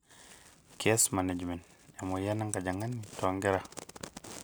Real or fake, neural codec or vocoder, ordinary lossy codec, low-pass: real; none; none; none